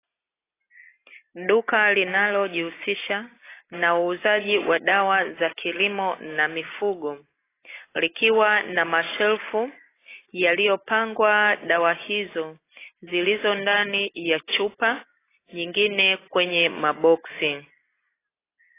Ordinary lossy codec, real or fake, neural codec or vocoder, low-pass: AAC, 16 kbps; real; none; 3.6 kHz